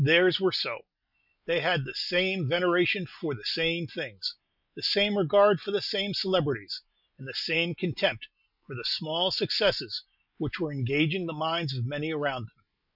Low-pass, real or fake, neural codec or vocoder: 5.4 kHz; real; none